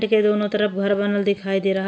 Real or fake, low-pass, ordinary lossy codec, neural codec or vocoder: real; none; none; none